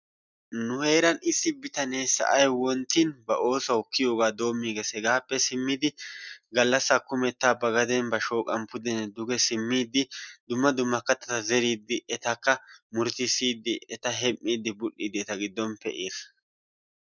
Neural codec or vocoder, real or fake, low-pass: none; real; 7.2 kHz